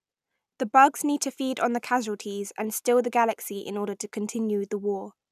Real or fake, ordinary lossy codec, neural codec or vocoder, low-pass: real; none; none; 14.4 kHz